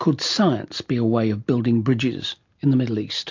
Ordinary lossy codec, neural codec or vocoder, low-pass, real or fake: MP3, 48 kbps; none; 7.2 kHz; real